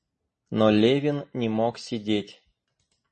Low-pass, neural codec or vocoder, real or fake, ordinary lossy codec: 10.8 kHz; none; real; MP3, 32 kbps